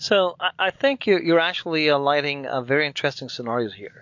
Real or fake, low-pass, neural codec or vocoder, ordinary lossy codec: real; 7.2 kHz; none; MP3, 48 kbps